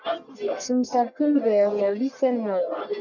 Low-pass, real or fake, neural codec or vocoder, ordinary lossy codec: 7.2 kHz; fake; codec, 44.1 kHz, 1.7 kbps, Pupu-Codec; MP3, 64 kbps